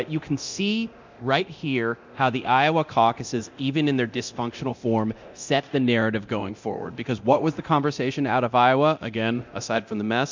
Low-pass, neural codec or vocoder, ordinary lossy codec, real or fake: 7.2 kHz; codec, 24 kHz, 0.9 kbps, DualCodec; MP3, 64 kbps; fake